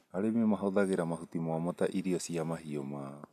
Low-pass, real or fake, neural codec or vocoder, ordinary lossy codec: 14.4 kHz; real; none; MP3, 96 kbps